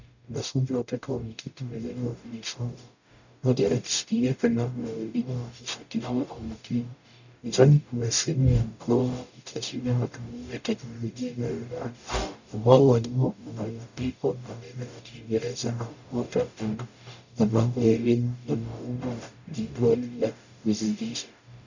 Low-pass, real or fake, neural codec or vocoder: 7.2 kHz; fake; codec, 44.1 kHz, 0.9 kbps, DAC